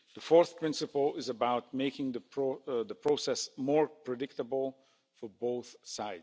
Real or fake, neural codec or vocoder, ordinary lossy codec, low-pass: real; none; none; none